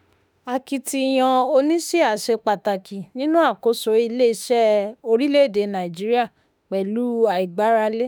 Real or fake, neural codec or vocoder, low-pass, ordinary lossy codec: fake; autoencoder, 48 kHz, 32 numbers a frame, DAC-VAE, trained on Japanese speech; none; none